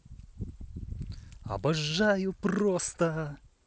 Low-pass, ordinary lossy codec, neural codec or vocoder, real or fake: none; none; none; real